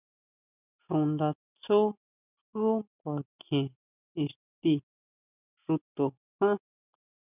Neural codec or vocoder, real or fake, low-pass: none; real; 3.6 kHz